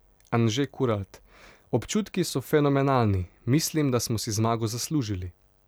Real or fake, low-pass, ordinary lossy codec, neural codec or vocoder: real; none; none; none